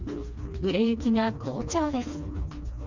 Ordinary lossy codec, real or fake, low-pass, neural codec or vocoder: Opus, 64 kbps; fake; 7.2 kHz; codec, 16 kHz, 2 kbps, FreqCodec, smaller model